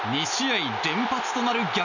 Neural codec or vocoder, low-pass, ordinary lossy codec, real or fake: none; 7.2 kHz; none; real